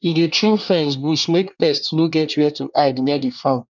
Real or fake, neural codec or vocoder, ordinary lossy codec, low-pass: fake; codec, 24 kHz, 1 kbps, SNAC; none; 7.2 kHz